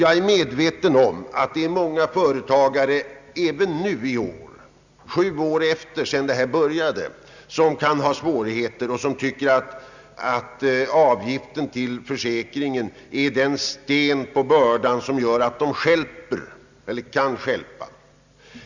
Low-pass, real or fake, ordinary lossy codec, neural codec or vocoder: 7.2 kHz; real; Opus, 64 kbps; none